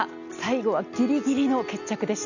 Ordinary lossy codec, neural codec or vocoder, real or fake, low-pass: none; none; real; 7.2 kHz